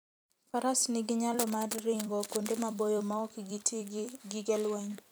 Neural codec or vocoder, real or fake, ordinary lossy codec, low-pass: vocoder, 44.1 kHz, 128 mel bands every 256 samples, BigVGAN v2; fake; none; none